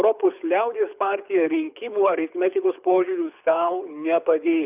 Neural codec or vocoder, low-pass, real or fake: codec, 24 kHz, 6 kbps, HILCodec; 3.6 kHz; fake